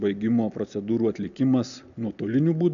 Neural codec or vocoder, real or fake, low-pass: none; real; 7.2 kHz